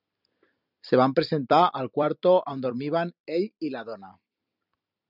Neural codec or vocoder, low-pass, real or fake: vocoder, 44.1 kHz, 128 mel bands every 256 samples, BigVGAN v2; 5.4 kHz; fake